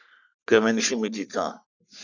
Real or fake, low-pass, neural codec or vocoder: fake; 7.2 kHz; codec, 44.1 kHz, 3.4 kbps, Pupu-Codec